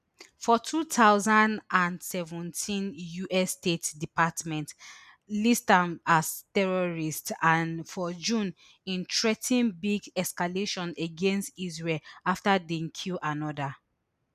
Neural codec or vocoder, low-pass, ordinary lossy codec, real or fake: none; 14.4 kHz; none; real